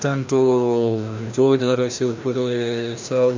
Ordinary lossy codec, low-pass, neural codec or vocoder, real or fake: MP3, 64 kbps; 7.2 kHz; codec, 16 kHz, 1 kbps, FreqCodec, larger model; fake